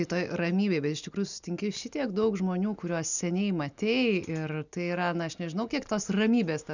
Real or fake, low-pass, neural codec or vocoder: real; 7.2 kHz; none